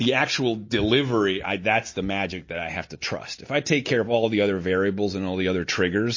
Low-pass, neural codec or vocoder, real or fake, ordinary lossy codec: 7.2 kHz; none; real; MP3, 32 kbps